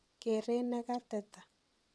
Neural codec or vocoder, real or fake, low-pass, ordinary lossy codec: vocoder, 22.05 kHz, 80 mel bands, WaveNeXt; fake; none; none